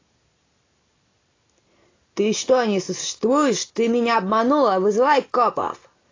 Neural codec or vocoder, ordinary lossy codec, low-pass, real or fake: none; AAC, 32 kbps; 7.2 kHz; real